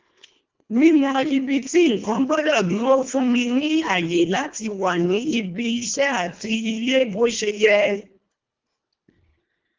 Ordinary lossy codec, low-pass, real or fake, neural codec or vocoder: Opus, 32 kbps; 7.2 kHz; fake; codec, 24 kHz, 1.5 kbps, HILCodec